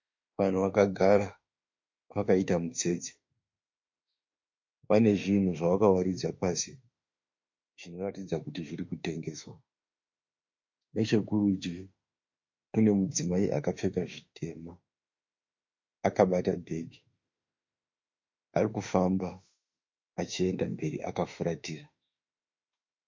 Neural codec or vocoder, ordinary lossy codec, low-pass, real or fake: autoencoder, 48 kHz, 32 numbers a frame, DAC-VAE, trained on Japanese speech; MP3, 48 kbps; 7.2 kHz; fake